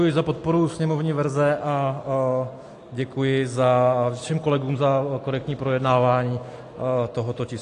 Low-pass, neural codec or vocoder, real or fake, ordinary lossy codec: 10.8 kHz; none; real; AAC, 48 kbps